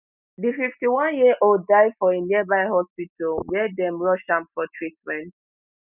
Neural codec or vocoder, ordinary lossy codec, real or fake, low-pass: none; none; real; 3.6 kHz